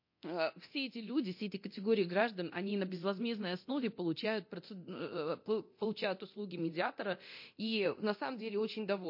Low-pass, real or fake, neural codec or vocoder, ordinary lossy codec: 5.4 kHz; fake; codec, 24 kHz, 0.9 kbps, DualCodec; MP3, 32 kbps